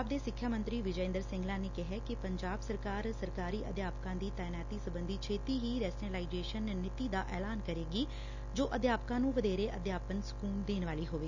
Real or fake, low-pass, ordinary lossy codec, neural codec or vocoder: real; 7.2 kHz; none; none